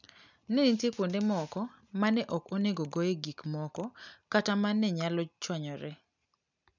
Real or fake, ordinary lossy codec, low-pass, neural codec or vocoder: real; none; 7.2 kHz; none